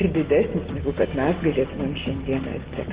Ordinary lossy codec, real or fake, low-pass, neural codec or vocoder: Opus, 16 kbps; real; 3.6 kHz; none